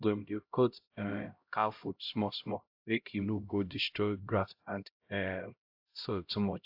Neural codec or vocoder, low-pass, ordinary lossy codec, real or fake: codec, 16 kHz, 0.5 kbps, X-Codec, HuBERT features, trained on LibriSpeech; 5.4 kHz; AAC, 48 kbps; fake